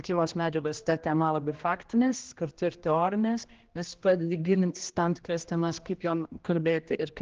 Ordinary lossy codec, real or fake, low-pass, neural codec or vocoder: Opus, 16 kbps; fake; 7.2 kHz; codec, 16 kHz, 1 kbps, X-Codec, HuBERT features, trained on general audio